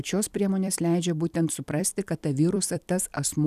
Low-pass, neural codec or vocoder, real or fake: 14.4 kHz; vocoder, 44.1 kHz, 128 mel bands every 256 samples, BigVGAN v2; fake